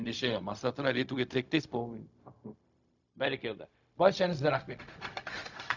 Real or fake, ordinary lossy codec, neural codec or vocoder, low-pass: fake; none; codec, 16 kHz, 0.4 kbps, LongCat-Audio-Codec; 7.2 kHz